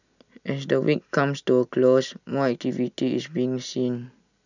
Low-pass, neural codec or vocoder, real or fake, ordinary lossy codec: 7.2 kHz; none; real; none